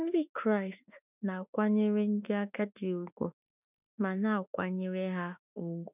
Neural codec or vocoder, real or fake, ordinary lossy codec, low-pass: autoencoder, 48 kHz, 32 numbers a frame, DAC-VAE, trained on Japanese speech; fake; none; 3.6 kHz